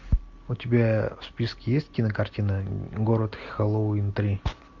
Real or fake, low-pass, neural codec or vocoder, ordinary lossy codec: real; 7.2 kHz; none; MP3, 48 kbps